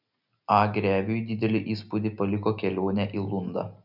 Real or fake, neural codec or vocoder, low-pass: real; none; 5.4 kHz